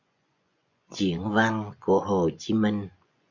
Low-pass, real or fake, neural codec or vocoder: 7.2 kHz; fake; vocoder, 24 kHz, 100 mel bands, Vocos